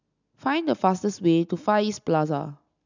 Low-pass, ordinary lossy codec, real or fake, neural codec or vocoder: 7.2 kHz; none; real; none